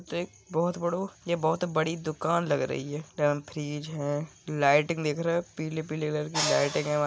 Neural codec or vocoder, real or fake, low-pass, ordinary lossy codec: none; real; none; none